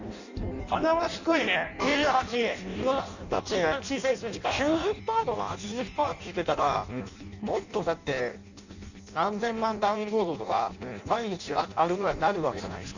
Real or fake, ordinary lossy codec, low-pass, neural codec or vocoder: fake; none; 7.2 kHz; codec, 16 kHz in and 24 kHz out, 0.6 kbps, FireRedTTS-2 codec